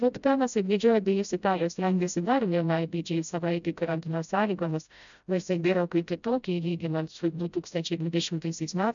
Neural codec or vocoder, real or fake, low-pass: codec, 16 kHz, 0.5 kbps, FreqCodec, smaller model; fake; 7.2 kHz